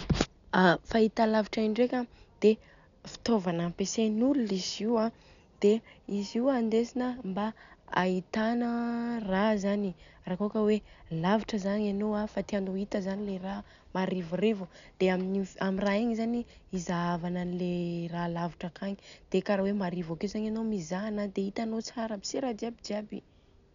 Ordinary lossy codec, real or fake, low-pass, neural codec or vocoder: none; real; 7.2 kHz; none